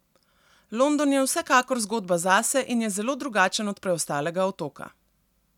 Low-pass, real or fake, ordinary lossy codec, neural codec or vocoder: 19.8 kHz; real; none; none